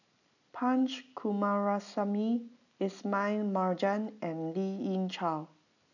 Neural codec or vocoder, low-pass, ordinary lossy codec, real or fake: none; 7.2 kHz; none; real